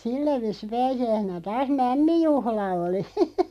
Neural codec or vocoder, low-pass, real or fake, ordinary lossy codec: none; 14.4 kHz; real; none